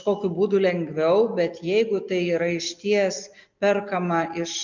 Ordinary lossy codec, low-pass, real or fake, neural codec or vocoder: MP3, 64 kbps; 7.2 kHz; real; none